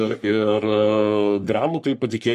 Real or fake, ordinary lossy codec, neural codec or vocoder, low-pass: fake; MP3, 64 kbps; codec, 44.1 kHz, 3.4 kbps, Pupu-Codec; 14.4 kHz